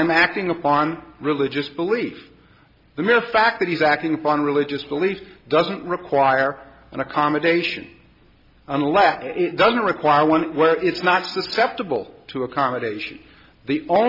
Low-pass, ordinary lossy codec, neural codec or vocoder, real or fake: 5.4 kHz; MP3, 48 kbps; none; real